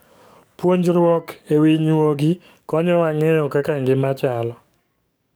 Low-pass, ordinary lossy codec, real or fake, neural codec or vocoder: none; none; fake; codec, 44.1 kHz, 7.8 kbps, DAC